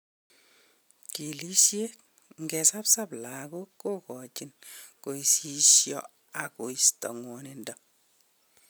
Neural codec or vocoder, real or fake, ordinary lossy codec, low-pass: none; real; none; none